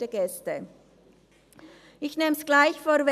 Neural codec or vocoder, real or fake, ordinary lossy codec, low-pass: none; real; none; 14.4 kHz